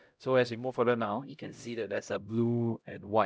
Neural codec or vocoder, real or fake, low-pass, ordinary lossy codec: codec, 16 kHz, 0.5 kbps, X-Codec, HuBERT features, trained on LibriSpeech; fake; none; none